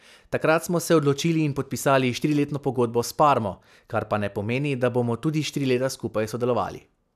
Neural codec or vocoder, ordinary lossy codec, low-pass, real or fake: none; none; 14.4 kHz; real